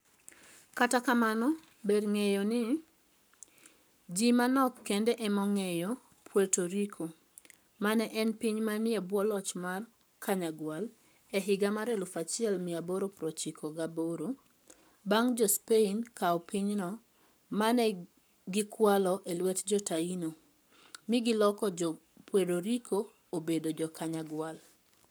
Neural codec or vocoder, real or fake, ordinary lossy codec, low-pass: codec, 44.1 kHz, 7.8 kbps, Pupu-Codec; fake; none; none